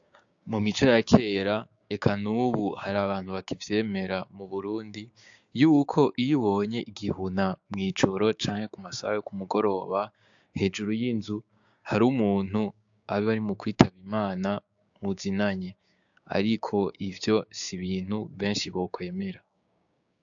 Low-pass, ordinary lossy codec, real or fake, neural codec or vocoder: 7.2 kHz; AAC, 64 kbps; fake; codec, 16 kHz, 6 kbps, DAC